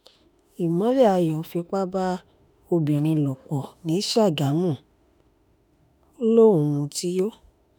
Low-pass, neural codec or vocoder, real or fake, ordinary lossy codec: none; autoencoder, 48 kHz, 32 numbers a frame, DAC-VAE, trained on Japanese speech; fake; none